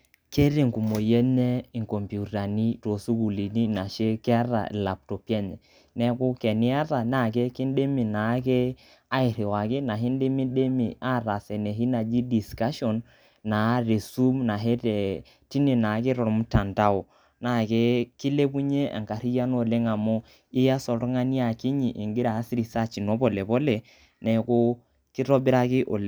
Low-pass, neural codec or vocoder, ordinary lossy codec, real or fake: none; none; none; real